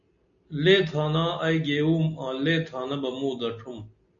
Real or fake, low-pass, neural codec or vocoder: real; 7.2 kHz; none